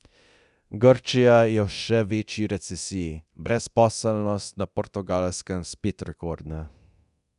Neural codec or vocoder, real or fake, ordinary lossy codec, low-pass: codec, 24 kHz, 0.9 kbps, DualCodec; fake; none; 10.8 kHz